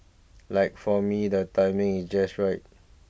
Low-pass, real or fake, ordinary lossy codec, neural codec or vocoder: none; real; none; none